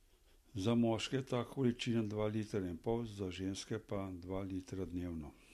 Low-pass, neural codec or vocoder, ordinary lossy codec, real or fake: 14.4 kHz; none; MP3, 64 kbps; real